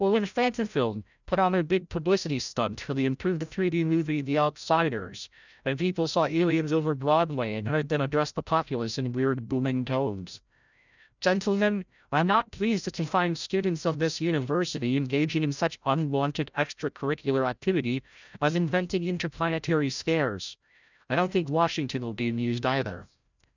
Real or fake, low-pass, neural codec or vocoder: fake; 7.2 kHz; codec, 16 kHz, 0.5 kbps, FreqCodec, larger model